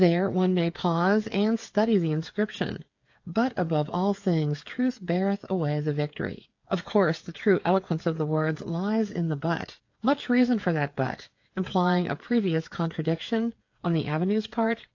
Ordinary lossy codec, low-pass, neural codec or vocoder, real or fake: AAC, 48 kbps; 7.2 kHz; codec, 16 kHz, 8 kbps, FreqCodec, smaller model; fake